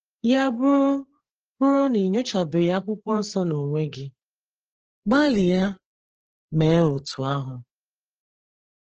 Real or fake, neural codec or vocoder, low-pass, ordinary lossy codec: fake; codec, 16 kHz, 4 kbps, FreqCodec, larger model; 7.2 kHz; Opus, 16 kbps